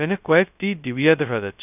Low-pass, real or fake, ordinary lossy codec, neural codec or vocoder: 3.6 kHz; fake; none; codec, 16 kHz, 0.2 kbps, FocalCodec